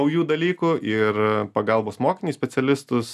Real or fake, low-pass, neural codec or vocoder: real; 14.4 kHz; none